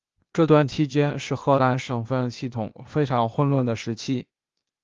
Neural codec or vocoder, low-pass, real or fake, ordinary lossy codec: codec, 16 kHz, 0.8 kbps, ZipCodec; 7.2 kHz; fake; Opus, 32 kbps